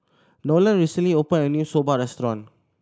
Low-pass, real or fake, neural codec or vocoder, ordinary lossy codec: none; real; none; none